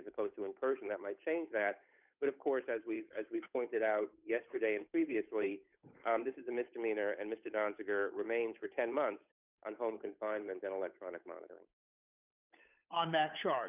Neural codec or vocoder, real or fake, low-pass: codec, 16 kHz, 8 kbps, FunCodec, trained on Chinese and English, 25 frames a second; fake; 3.6 kHz